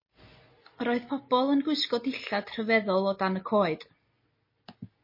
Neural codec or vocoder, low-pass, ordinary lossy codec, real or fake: none; 5.4 kHz; MP3, 24 kbps; real